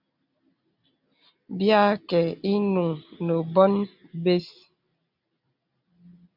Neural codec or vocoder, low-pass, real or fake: none; 5.4 kHz; real